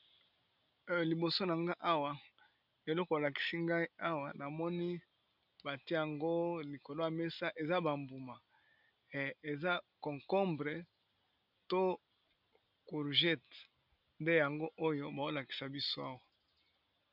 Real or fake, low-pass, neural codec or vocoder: real; 5.4 kHz; none